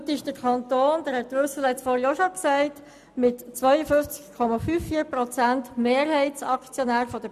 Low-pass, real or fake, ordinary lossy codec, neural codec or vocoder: 14.4 kHz; real; none; none